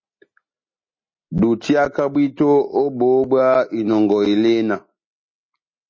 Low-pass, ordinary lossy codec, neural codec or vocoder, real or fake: 7.2 kHz; MP3, 32 kbps; none; real